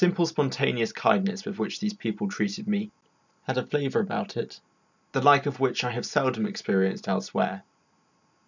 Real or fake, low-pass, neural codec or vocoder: real; 7.2 kHz; none